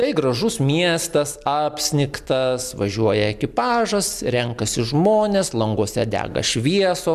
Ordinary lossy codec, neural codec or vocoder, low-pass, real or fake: MP3, 96 kbps; none; 14.4 kHz; real